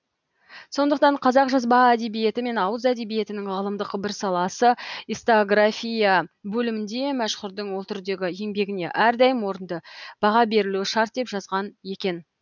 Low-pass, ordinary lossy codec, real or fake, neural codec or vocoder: 7.2 kHz; none; real; none